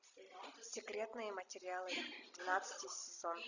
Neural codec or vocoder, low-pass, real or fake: none; 7.2 kHz; real